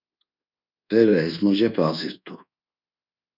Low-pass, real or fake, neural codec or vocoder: 5.4 kHz; fake; codec, 24 kHz, 1.2 kbps, DualCodec